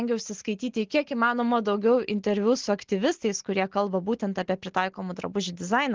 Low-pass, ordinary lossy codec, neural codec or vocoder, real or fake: 7.2 kHz; Opus, 16 kbps; none; real